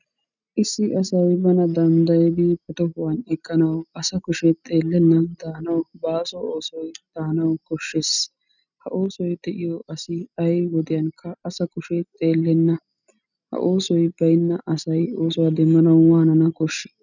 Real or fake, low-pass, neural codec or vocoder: real; 7.2 kHz; none